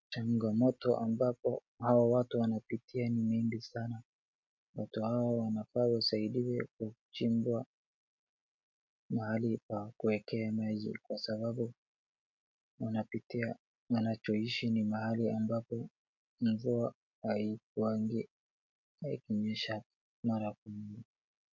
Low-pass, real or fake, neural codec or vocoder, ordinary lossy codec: 7.2 kHz; real; none; MP3, 48 kbps